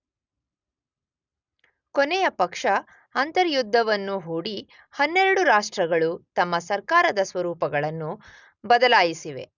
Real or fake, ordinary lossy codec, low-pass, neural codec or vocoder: real; none; 7.2 kHz; none